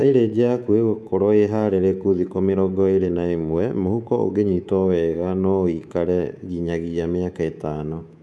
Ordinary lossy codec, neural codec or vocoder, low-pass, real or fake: none; codec, 24 kHz, 3.1 kbps, DualCodec; none; fake